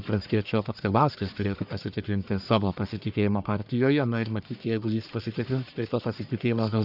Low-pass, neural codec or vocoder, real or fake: 5.4 kHz; codec, 44.1 kHz, 1.7 kbps, Pupu-Codec; fake